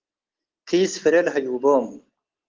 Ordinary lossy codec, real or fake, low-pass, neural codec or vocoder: Opus, 16 kbps; real; 7.2 kHz; none